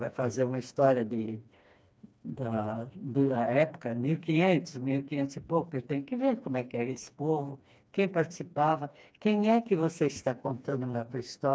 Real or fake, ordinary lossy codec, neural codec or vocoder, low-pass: fake; none; codec, 16 kHz, 2 kbps, FreqCodec, smaller model; none